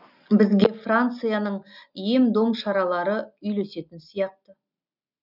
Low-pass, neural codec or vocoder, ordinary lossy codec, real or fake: 5.4 kHz; none; none; real